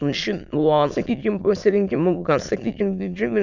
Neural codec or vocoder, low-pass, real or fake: autoencoder, 22.05 kHz, a latent of 192 numbers a frame, VITS, trained on many speakers; 7.2 kHz; fake